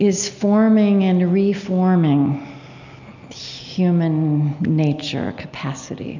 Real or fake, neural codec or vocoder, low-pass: real; none; 7.2 kHz